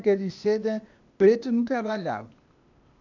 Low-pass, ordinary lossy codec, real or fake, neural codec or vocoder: 7.2 kHz; none; fake; codec, 16 kHz, 0.8 kbps, ZipCodec